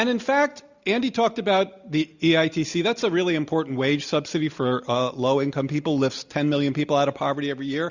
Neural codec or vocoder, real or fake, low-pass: none; real; 7.2 kHz